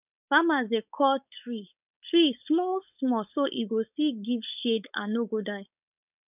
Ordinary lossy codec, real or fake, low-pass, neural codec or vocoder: none; fake; 3.6 kHz; codec, 16 kHz, 4.8 kbps, FACodec